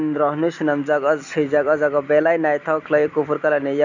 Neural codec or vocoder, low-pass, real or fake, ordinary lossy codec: none; 7.2 kHz; real; none